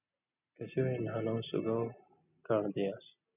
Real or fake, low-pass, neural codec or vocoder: real; 3.6 kHz; none